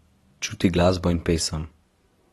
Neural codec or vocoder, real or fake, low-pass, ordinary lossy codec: none; real; 19.8 kHz; AAC, 32 kbps